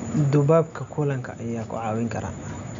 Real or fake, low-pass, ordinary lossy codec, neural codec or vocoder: real; 7.2 kHz; none; none